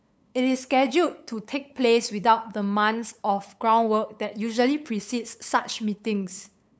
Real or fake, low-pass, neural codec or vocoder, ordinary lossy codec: fake; none; codec, 16 kHz, 8 kbps, FunCodec, trained on LibriTTS, 25 frames a second; none